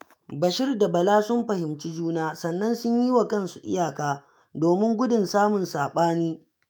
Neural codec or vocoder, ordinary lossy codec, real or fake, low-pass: autoencoder, 48 kHz, 128 numbers a frame, DAC-VAE, trained on Japanese speech; none; fake; none